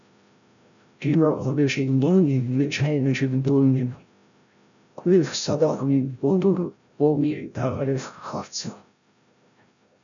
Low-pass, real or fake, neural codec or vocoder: 7.2 kHz; fake; codec, 16 kHz, 0.5 kbps, FreqCodec, larger model